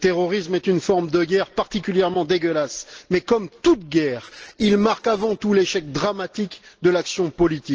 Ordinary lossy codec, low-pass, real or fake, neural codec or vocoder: Opus, 16 kbps; 7.2 kHz; real; none